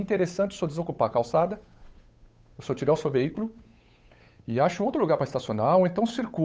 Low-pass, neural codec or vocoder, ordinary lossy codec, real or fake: none; codec, 16 kHz, 8 kbps, FunCodec, trained on Chinese and English, 25 frames a second; none; fake